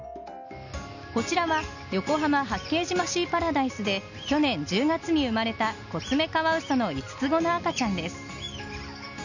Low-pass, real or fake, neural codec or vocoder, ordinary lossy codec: 7.2 kHz; real; none; none